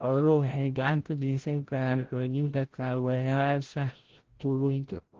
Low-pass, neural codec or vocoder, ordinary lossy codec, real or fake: 7.2 kHz; codec, 16 kHz, 0.5 kbps, FreqCodec, larger model; Opus, 16 kbps; fake